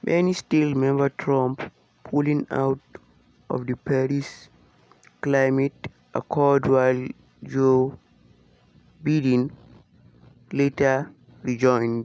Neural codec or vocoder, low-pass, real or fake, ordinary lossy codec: none; none; real; none